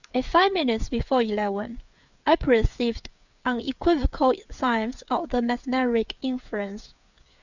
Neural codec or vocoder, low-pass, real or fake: codec, 16 kHz, 8 kbps, FreqCodec, smaller model; 7.2 kHz; fake